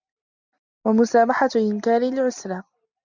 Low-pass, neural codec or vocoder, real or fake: 7.2 kHz; none; real